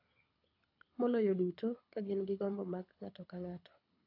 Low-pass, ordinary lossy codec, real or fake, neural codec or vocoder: 5.4 kHz; AAC, 48 kbps; fake; codec, 24 kHz, 6 kbps, HILCodec